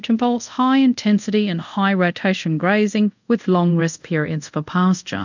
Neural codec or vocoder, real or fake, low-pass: codec, 24 kHz, 0.5 kbps, DualCodec; fake; 7.2 kHz